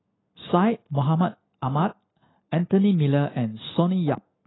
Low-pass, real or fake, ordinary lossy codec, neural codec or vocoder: 7.2 kHz; real; AAC, 16 kbps; none